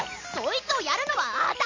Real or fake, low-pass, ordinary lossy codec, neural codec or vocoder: real; 7.2 kHz; MP3, 48 kbps; none